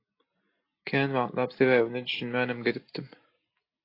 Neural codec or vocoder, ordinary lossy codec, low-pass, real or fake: none; AAC, 32 kbps; 5.4 kHz; real